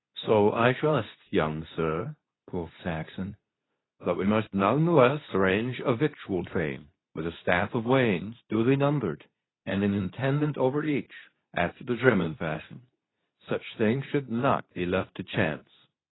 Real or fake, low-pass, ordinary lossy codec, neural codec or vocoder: fake; 7.2 kHz; AAC, 16 kbps; codec, 24 kHz, 0.9 kbps, WavTokenizer, medium speech release version 2